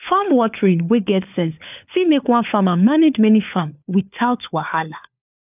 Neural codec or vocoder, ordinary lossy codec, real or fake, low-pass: codec, 16 kHz, 4 kbps, FunCodec, trained on LibriTTS, 50 frames a second; none; fake; 3.6 kHz